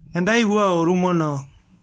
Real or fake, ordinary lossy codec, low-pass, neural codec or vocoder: fake; none; 10.8 kHz; codec, 24 kHz, 0.9 kbps, WavTokenizer, medium speech release version 1